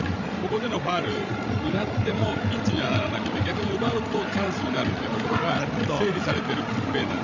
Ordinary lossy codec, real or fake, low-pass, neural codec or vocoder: none; fake; 7.2 kHz; codec, 16 kHz, 16 kbps, FreqCodec, larger model